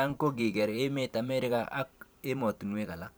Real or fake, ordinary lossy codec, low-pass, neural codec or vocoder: fake; none; none; vocoder, 44.1 kHz, 128 mel bands every 512 samples, BigVGAN v2